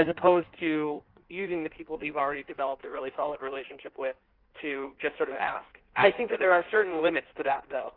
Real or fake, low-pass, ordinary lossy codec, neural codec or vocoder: fake; 5.4 kHz; Opus, 32 kbps; codec, 16 kHz in and 24 kHz out, 1.1 kbps, FireRedTTS-2 codec